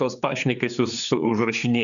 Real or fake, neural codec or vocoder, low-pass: fake; codec, 16 kHz, 4 kbps, X-Codec, HuBERT features, trained on general audio; 7.2 kHz